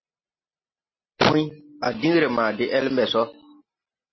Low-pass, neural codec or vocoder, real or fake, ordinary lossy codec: 7.2 kHz; none; real; MP3, 24 kbps